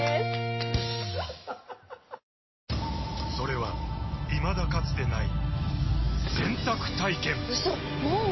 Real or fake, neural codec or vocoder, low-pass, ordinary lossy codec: real; none; 7.2 kHz; MP3, 24 kbps